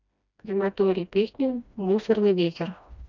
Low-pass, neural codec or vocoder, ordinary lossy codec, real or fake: 7.2 kHz; codec, 16 kHz, 1 kbps, FreqCodec, smaller model; none; fake